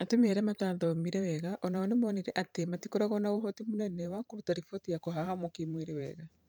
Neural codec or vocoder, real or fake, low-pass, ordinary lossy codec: vocoder, 44.1 kHz, 128 mel bands every 512 samples, BigVGAN v2; fake; none; none